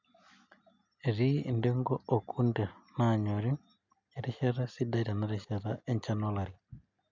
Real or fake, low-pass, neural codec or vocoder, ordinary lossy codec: real; 7.2 kHz; none; MP3, 64 kbps